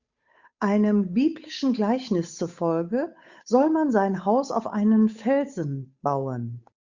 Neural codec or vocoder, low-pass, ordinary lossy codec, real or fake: codec, 16 kHz, 8 kbps, FunCodec, trained on Chinese and English, 25 frames a second; 7.2 kHz; Opus, 64 kbps; fake